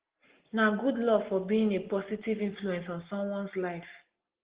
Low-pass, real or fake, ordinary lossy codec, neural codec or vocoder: 3.6 kHz; real; Opus, 16 kbps; none